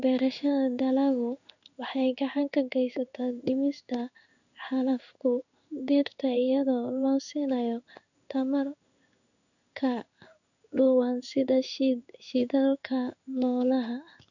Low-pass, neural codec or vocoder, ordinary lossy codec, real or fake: 7.2 kHz; codec, 16 kHz in and 24 kHz out, 1 kbps, XY-Tokenizer; none; fake